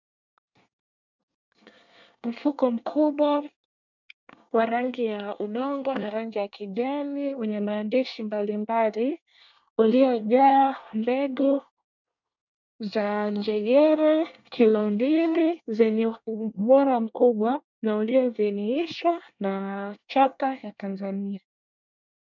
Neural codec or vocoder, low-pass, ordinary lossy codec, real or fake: codec, 24 kHz, 1 kbps, SNAC; 7.2 kHz; MP3, 64 kbps; fake